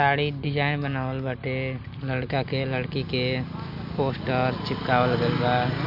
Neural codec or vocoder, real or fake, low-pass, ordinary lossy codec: none; real; 5.4 kHz; none